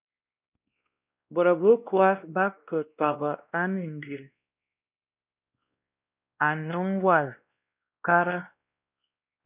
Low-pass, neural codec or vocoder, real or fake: 3.6 kHz; codec, 16 kHz, 1 kbps, X-Codec, WavLM features, trained on Multilingual LibriSpeech; fake